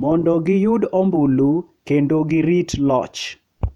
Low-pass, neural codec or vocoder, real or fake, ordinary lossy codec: 19.8 kHz; vocoder, 48 kHz, 128 mel bands, Vocos; fake; none